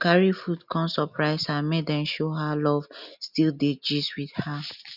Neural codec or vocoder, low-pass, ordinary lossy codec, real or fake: none; 5.4 kHz; none; real